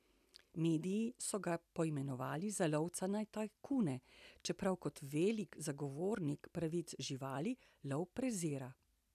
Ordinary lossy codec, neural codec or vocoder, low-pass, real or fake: none; none; 14.4 kHz; real